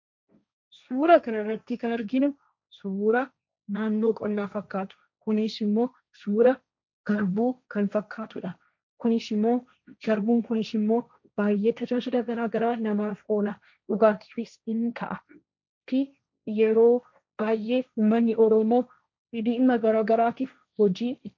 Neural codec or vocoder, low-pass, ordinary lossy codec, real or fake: codec, 16 kHz, 1.1 kbps, Voila-Tokenizer; 7.2 kHz; MP3, 64 kbps; fake